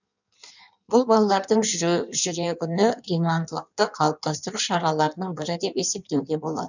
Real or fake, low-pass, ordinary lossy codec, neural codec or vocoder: fake; 7.2 kHz; none; codec, 16 kHz in and 24 kHz out, 1.1 kbps, FireRedTTS-2 codec